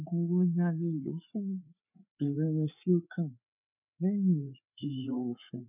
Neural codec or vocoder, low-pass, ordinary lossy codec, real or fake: codec, 16 kHz, 4 kbps, FreqCodec, larger model; 3.6 kHz; none; fake